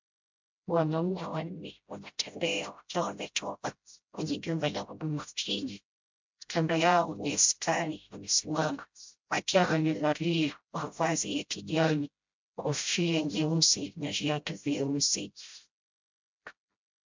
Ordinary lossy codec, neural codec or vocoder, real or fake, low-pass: MP3, 64 kbps; codec, 16 kHz, 0.5 kbps, FreqCodec, smaller model; fake; 7.2 kHz